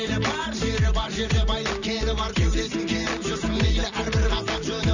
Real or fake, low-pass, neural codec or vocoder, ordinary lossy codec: real; 7.2 kHz; none; none